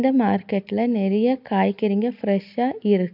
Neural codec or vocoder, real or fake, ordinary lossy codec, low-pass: none; real; AAC, 48 kbps; 5.4 kHz